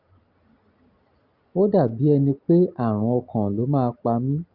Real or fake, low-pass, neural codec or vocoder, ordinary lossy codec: real; 5.4 kHz; none; Opus, 32 kbps